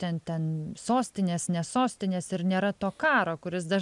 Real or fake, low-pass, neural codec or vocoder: real; 10.8 kHz; none